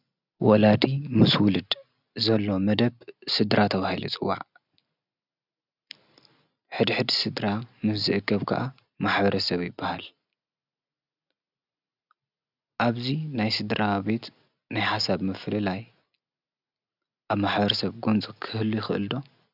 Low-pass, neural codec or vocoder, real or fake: 5.4 kHz; none; real